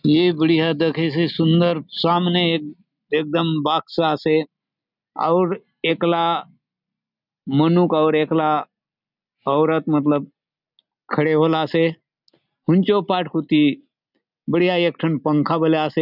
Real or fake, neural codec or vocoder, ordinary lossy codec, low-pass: real; none; none; 5.4 kHz